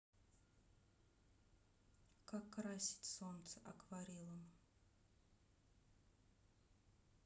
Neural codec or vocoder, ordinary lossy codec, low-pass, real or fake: none; none; none; real